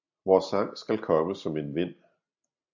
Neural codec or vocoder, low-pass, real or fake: none; 7.2 kHz; real